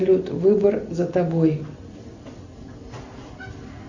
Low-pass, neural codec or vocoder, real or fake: 7.2 kHz; none; real